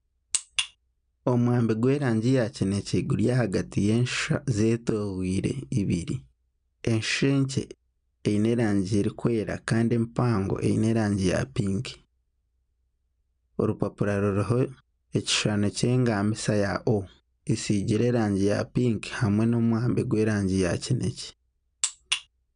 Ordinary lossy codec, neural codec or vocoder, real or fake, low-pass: none; none; real; 9.9 kHz